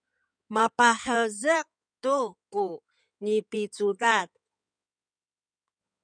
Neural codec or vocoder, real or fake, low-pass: codec, 16 kHz in and 24 kHz out, 2.2 kbps, FireRedTTS-2 codec; fake; 9.9 kHz